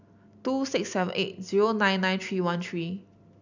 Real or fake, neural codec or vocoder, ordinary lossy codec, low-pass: real; none; none; 7.2 kHz